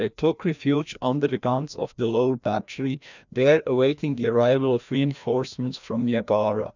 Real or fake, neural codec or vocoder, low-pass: fake; codec, 16 kHz, 1 kbps, FreqCodec, larger model; 7.2 kHz